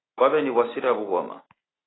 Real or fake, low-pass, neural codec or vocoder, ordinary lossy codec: real; 7.2 kHz; none; AAC, 16 kbps